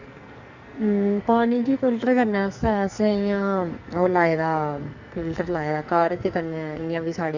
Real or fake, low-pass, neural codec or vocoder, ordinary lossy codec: fake; 7.2 kHz; codec, 44.1 kHz, 2.6 kbps, SNAC; Opus, 64 kbps